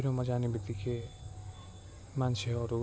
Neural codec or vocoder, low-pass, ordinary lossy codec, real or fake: none; none; none; real